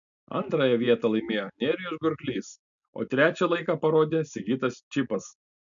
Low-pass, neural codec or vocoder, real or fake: 7.2 kHz; none; real